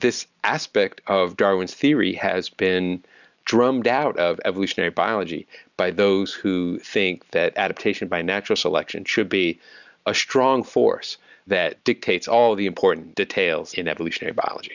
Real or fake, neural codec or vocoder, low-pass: real; none; 7.2 kHz